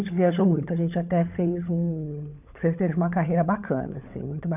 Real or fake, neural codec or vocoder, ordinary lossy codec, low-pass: fake; codec, 16 kHz, 16 kbps, FunCodec, trained on LibriTTS, 50 frames a second; none; 3.6 kHz